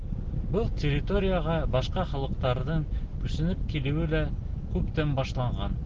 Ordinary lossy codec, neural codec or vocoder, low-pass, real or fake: Opus, 16 kbps; none; 7.2 kHz; real